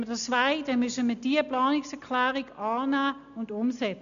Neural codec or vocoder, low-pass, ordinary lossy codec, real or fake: none; 7.2 kHz; none; real